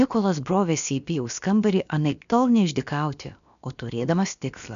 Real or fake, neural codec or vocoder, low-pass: fake; codec, 16 kHz, about 1 kbps, DyCAST, with the encoder's durations; 7.2 kHz